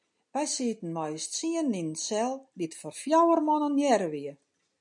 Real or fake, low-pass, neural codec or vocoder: real; 10.8 kHz; none